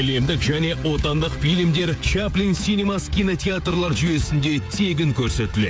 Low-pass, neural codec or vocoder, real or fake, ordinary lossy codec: none; codec, 16 kHz, 16 kbps, FreqCodec, smaller model; fake; none